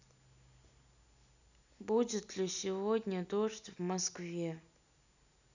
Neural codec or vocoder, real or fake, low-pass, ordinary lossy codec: none; real; 7.2 kHz; none